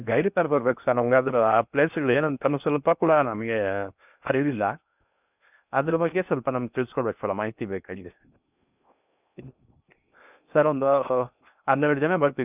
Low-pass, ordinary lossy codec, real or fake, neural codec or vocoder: 3.6 kHz; none; fake; codec, 16 kHz in and 24 kHz out, 0.6 kbps, FocalCodec, streaming, 4096 codes